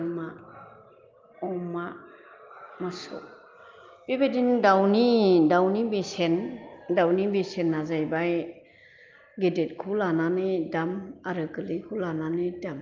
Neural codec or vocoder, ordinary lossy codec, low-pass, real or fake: none; Opus, 24 kbps; 7.2 kHz; real